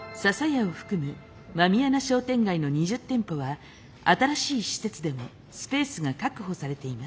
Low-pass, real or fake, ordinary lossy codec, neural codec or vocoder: none; real; none; none